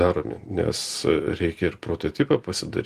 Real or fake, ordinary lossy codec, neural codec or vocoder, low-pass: real; Opus, 24 kbps; none; 14.4 kHz